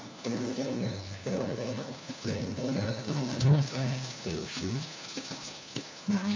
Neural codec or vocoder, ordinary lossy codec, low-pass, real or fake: codec, 16 kHz, 1 kbps, FunCodec, trained on LibriTTS, 50 frames a second; MP3, 48 kbps; 7.2 kHz; fake